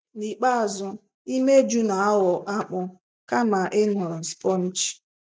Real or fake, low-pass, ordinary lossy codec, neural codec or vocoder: real; none; none; none